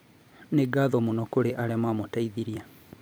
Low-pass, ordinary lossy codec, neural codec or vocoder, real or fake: none; none; vocoder, 44.1 kHz, 128 mel bands every 256 samples, BigVGAN v2; fake